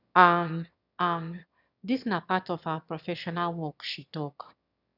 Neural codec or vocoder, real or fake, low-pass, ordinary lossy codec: autoencoder, 22.05 kHz, a latent of 192 numbers a frame, VITS, trained on one speaker; fake; 5.4 kHz; none